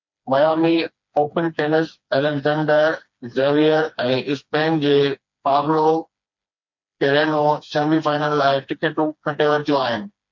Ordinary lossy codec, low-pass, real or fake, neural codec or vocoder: MP3, 48 kbps; 7.2 kHz; fake; codec, 16 kHz, 2 kbps, FreqCodec, smaller model